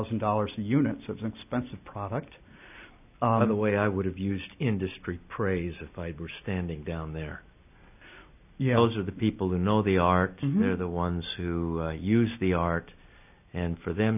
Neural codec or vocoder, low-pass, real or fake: none; 3.6 kHz; real